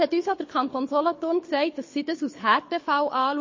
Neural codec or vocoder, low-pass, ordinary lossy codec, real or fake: codec, 24 kHz, 6 kbps, HILCodec; 7.2 kHz; MP3, 32 kbps; fake